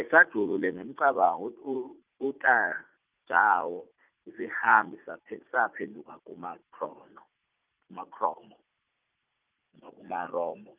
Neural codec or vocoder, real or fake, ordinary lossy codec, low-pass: vocoder, 44.1 kHz, 80 mel bands, Vocos; fake; Opus, 24 kbps; 3.6 kHz